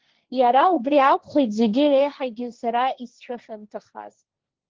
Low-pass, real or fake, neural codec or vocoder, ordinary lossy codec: 7.2 kHz; fake; codec, 16 kHz, 1.1 kbps, Voila-Tokenizer; Opus, 16 kbps